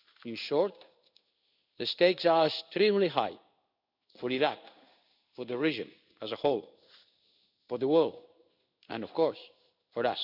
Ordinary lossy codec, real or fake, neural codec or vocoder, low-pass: none; fake; codec, 16 kHz in and 24 kHz out, 1 kbps, XY-Tokenizer; 5.4 kHz